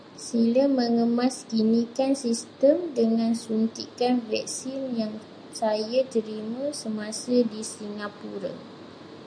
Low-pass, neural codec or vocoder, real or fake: 9.9 kHz; none; real